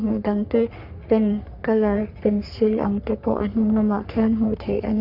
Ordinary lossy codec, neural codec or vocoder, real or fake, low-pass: none; codec, 44.1 kHz, 3.4 kbps, Pupu-Codec; fake; 5.4 kHz